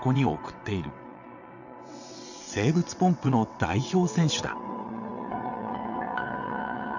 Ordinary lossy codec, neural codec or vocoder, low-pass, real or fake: none; vocoder, 22.05 kHz, 80 mel bands, WaveNeXt; 7.2 kHz; fake